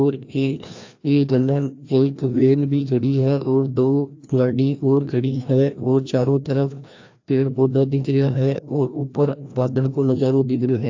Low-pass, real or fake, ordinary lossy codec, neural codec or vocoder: 7.2 kHz; fake; AAC, 48 kbps; codec, 16 kHz, 1 kbps, FreqCodec, larger model